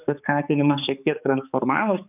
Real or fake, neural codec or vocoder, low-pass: fake; codec, 16 kHz, 4 kbps, X-Codec, HuBERT features, trained on balanced general audio; 3.6 kHz